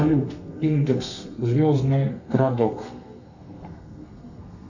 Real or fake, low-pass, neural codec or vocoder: fake; 7.2 kHz; codec, 32 kHz, 1.9 kbps, SNAC